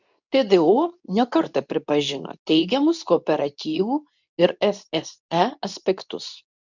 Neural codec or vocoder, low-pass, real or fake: codec, 24 kHz, 0.9 kbps, WavTokenizer, medium speech release version 2; 7.2 kHz; fake